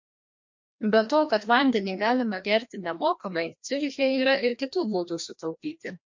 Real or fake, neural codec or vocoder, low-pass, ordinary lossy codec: fake; codec, 16 kHz, 1 kbps, FreqCodec, larger model; 7.2 kHz; MP3, 48 kbps